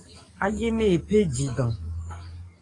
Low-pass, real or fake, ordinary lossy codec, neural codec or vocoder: 10.8 kHz; fake; AAC, 32 kbps; codec, 44.1 kHz, 7.8 kbps, DAC